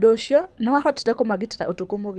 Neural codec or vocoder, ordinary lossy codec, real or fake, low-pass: codec, 24 kHz, 6 kbps, HILCodec; none; fake; none